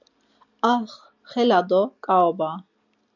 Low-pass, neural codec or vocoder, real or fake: 7.2 kHz; none; real